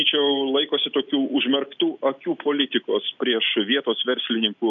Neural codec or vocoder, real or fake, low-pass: none; real; 7.2 kHz